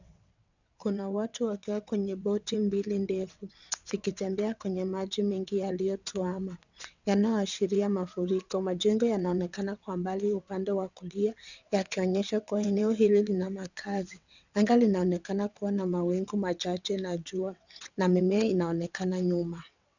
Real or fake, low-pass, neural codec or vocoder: fake; 7.2 kHz; vocoder, 22.05 kHz, 80 mel bands, WaveNeXt